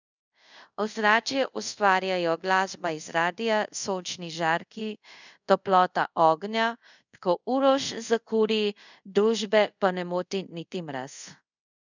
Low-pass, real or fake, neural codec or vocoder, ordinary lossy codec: 7.2 kHz; fake; codec, 24 kHz, 0.5 kbps, DualCodec; none